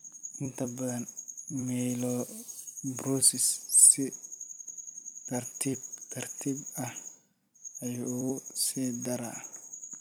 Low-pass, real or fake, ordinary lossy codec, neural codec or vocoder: none; fake; none; vocoder, 44.1 kHz, 128 mel bands every 256 samples, BigVGAN v2